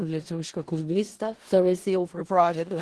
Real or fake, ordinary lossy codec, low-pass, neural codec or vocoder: fake; Opus, 16 kbps; 10.8 kHz; codec, 16 kHz in and 24 kHz out, 0.4 kbps, LongCat-Audio-Codec, four codebook decoder